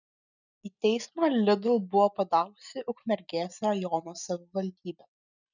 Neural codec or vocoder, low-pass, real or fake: none; 7.2 kHz; real